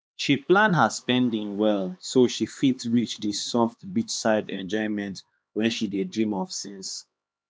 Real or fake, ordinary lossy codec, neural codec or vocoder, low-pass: fake; none; codec, 16 kHz, 2 kbps, X-Codec, HuBERT features, trained on LibriSpeech; none